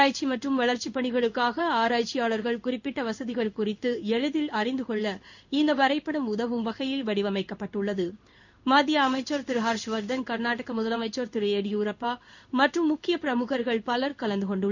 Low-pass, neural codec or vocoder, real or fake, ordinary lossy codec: 7.2 kHz; codec, 16 kHz in and 24 kHz out, 1 kbps, XY-Tokenizer; fake; none